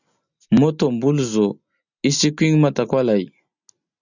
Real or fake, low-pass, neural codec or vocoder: real; 7.2 kHz; none